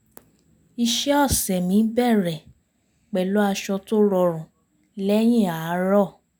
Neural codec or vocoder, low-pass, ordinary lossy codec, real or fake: none; none; none; real